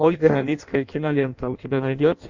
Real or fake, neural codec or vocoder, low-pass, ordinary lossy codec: fake; codec, 16 kHz in and 24 kHz out, 0.6 kbps, FireRedTTS-2 codec; 7.2 kHz; Opus, 64 kbps